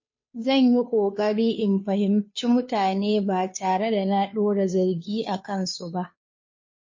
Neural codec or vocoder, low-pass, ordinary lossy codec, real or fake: codec, 16 kHz, 2 kbps, FunCodec, trained on Chinese and English, 25 frames a second; 7.2 kHz; MP3, 32 kbps; fake